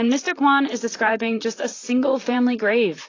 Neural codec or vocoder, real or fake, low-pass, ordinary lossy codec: vocoder, 44.1 kHz, 128 mel bands, Pupu-Vocoder; fake; 7.2 kHz; AAC, 32 kbps